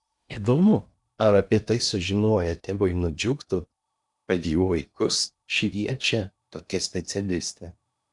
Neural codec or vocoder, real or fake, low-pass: codec, 16 kHz in and 24 kHz out, 0.8 kbps, FocalCodec, streaming, 65536 codes; fake; 10.8 kHz